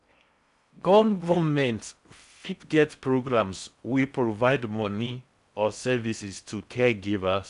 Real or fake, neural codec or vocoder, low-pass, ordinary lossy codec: fake; codec, 16 kHz in and 24 kHz out, 0.6 kbps, FocalCodec, streaming, 4096 codes; 10.8 kHz; none